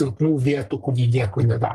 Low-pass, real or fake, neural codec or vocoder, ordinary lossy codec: 14.4 kHz; fake; codec, 44.1 kHz, 3.4 kbps, Pupu-Codec; Opus, 32 kbps